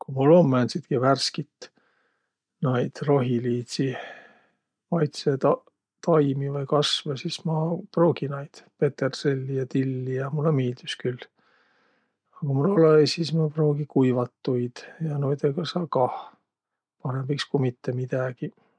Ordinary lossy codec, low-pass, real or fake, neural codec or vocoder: none; 9.9 kHz; real; none